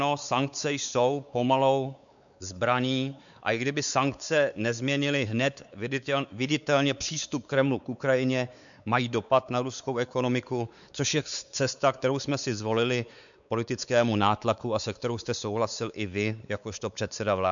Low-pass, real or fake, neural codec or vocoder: 7.2 kHz; fake; codec, 16 kHz, 4 kbps, X-Codec, WavLM features, trained on Multilingual LibriSpeech